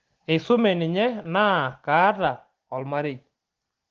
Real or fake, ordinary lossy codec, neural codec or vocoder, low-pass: real; Opus, 16 kbps; none; 7.2 kHz